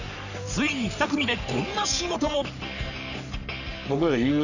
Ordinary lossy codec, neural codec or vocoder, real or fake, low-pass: none; codec, 44.1 kHz, 3.4 kbps, Pupu-Codec; fake; 7.2 kHz